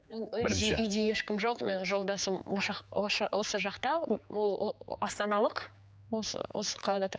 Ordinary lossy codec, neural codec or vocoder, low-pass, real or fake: none; codec, 16 kHz, 4 kbps, X-Codec, HuBERT features, trained on general audio; none; fake